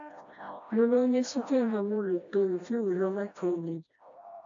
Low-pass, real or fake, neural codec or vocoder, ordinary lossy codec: 7.2 kHz; fake; codec, 16 kHz, 1 kbps, FreqCodec, smaller model; AAC, 48 kbps